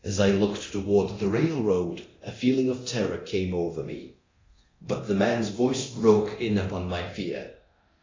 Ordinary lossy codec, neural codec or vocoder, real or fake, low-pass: AAC, 32 kbps; codec, 24 kHz, 0.9 kbps, DualCodec; fake; 7.2 kHz